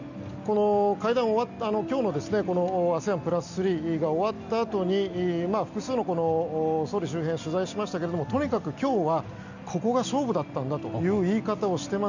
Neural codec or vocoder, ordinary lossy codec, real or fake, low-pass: none; none; real; 7.2 kHz